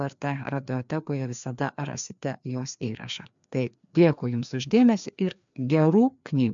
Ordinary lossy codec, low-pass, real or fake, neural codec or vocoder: MP3, 64 kbps; 7.2 kHz; fake; codec, 16 kHz, 2 kbps, FreqCodec, larger model